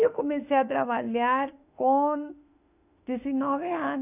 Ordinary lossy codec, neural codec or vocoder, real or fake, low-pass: none; autoencoder, 48 kHz, 32 numbers a frame, DAC-VAE, trained on Japanese speech; fake; 3.6 kHz